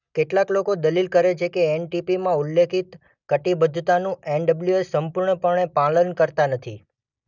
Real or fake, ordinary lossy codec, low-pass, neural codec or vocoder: real; none; 7.2 kHz; none